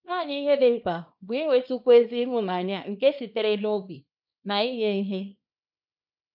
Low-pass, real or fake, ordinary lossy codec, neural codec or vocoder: 5.4 kHz; fake; none; codec, 24 kHz, 0.9 kbps, WavTokenizer, small release